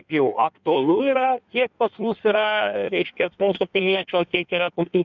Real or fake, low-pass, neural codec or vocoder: fake; 7.2 kHz; codec, 16 kHz in and 24 kHz out, 1.1 kbps, FireRedTTS-2 codec